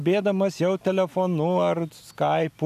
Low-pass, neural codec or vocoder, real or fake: 14.4 kHz; none; real